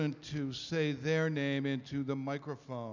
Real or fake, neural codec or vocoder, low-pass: real; none; 7.2 kHz